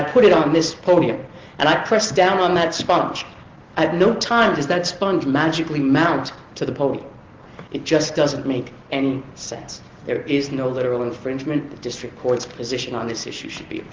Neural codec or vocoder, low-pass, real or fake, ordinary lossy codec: none; 7.2 kHz; real; Opus, 16 kbps